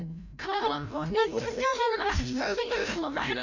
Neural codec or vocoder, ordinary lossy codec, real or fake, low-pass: codec, 16 kHz, 0.5 kbps, FreqCodec, larger model; none; fake; 7.2 kHz